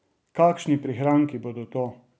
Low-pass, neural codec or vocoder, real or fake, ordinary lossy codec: none; none; real; none